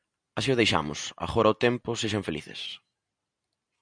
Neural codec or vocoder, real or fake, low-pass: none; real; 9.9 kHz